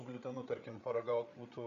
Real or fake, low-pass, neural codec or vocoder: fake; 7.2 kHz; codec, 16 kHz, 8 kbps, FreqCodec, larger model